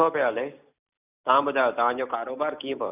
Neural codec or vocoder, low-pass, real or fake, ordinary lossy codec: none; 3.6 kHz; real; none